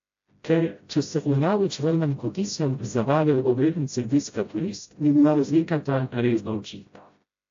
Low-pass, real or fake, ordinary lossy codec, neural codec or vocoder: 7.2 kHz; fake; AAC, 48 kbps; codec, 16 kHz, 0.5 kbps, FreqCodec, smaller model